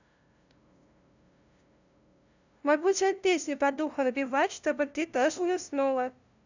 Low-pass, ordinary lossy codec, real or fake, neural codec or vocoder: 7.2 kHz; none; fake; codec, 16 kHz, 0.5 kbps, FunCodec, trained on LibriTTS, 25 frames a second